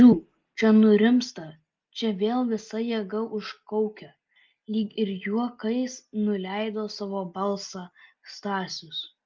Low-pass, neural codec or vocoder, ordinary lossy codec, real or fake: 7.2 kHz; none; Opus, 24 kbps; real